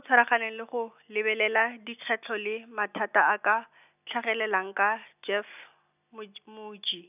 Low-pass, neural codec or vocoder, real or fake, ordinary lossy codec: 3.6 kHz; none; real; none